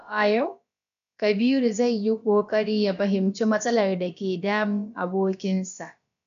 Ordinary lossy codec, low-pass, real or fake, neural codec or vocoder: none; 7.2 kHz; fake; codec, 16 kHz, about 1 kbps, DyCAST, with the encoder's durations